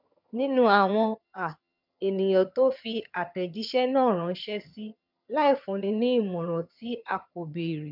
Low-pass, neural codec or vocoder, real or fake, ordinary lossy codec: 5.4 kHz; vocoder, 22.05 kHz, 80 mel bands, HiFi-GAN; fake; none